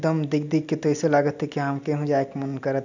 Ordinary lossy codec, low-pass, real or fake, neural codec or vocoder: none; 7.2 kHz; real; none